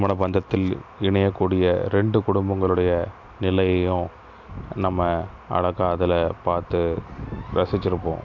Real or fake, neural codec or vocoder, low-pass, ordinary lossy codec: real; none; 7.2 kHz; MP3, 64 kbps